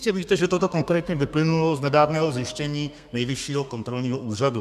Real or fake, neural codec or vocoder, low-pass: fake; codec, 32 kHz, 1.9 kbps, SNAC; 14.4 kHz